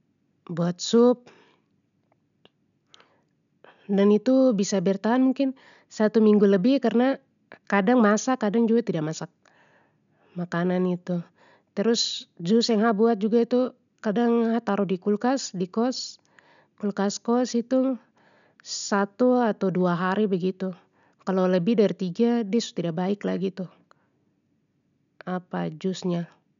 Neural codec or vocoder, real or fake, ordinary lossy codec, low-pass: none; real; none; 7.2 kHz